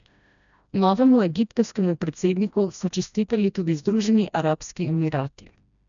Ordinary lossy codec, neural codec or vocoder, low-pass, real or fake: none; codec, 16 kHz, 1 kbps, FreqCodec, smaller model; 7.2 kHz; fake